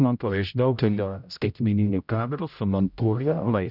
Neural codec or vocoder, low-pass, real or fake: codec, 16 kHz, 0.5 kbps, X-Codec, HuBERT features, trained on general audio; 5.4 kHz; fake